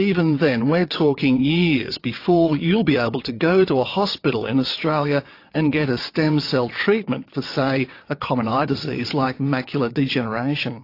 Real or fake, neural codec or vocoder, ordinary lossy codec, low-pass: fake; vocoder, 22.05 kHz, 80 mel bands, WaveNeXt; AAC, 32 kbps; 5.4 kHz